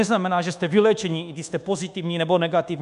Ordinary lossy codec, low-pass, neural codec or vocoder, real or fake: MP3, 96 kbps; 10.8 kHz; codec, 24 kHz, 1.2 kbps, DualCodec; fake